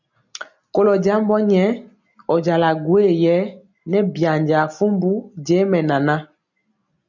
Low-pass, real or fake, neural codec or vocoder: 7.2 kHz; real; none